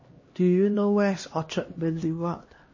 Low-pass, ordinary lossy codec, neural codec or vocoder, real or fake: 7.2 kHz; MP3, 32 kbps; codec, 16 kHz, 1 kbps, X-Codec, HuBERT features, trained on LibriSpeech; fake